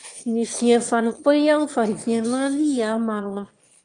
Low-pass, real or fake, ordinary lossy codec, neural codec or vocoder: 9.9 kHz; fake; Opus, 32 kbps; autoencoder, 22.05 kHz, a latent of 192 numbers a frame, VITS, trained on one speaker